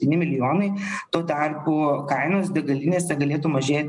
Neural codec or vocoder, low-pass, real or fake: none; 10.8 kHz; real